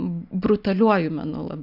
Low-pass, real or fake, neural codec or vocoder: 5.4 kHz; real; none